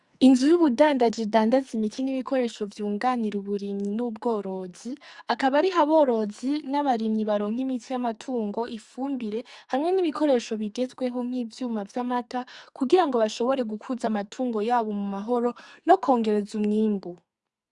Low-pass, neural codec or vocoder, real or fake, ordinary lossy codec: 10.8 kHz; codec, 44.1 kHz, 2.6 kbps, SNAC; fake; Opus, 64 kbps